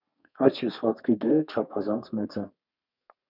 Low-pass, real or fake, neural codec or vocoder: 5.4 kHz; fake; codec, 32 kHz, 1.9 kbps, SNAC